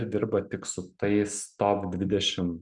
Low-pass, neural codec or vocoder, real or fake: 10.8 kHz; none; real